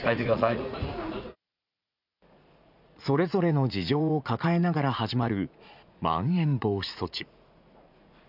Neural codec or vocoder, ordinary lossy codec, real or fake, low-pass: vocoder, 44.1 kHz, 80 mel bands, Vocos; none; fake; 5.4 kHz